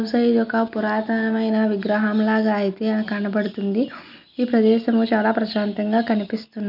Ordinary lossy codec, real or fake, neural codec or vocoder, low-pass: none; real; none; 5.4 kHz